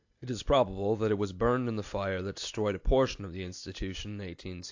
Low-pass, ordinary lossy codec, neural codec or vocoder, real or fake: 7.2 kHz; AAC, 48 kbps; none; real